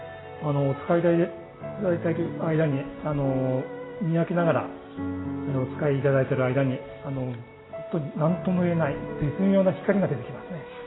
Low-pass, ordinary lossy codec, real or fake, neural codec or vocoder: 7.2 kHz; AAC, 16 kbps; real; none